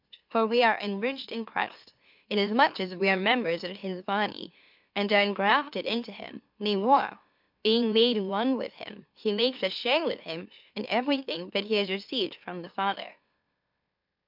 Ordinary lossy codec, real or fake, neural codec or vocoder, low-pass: MP3, 48 kbps; fake; autoencoder, 44.1 kHz, a latent of 192 numbers a frame, MeloTTS; 5.4 kHz